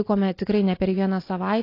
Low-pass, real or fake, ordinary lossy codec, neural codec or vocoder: 5.4 kHz; real; AAC, 32 kbps; none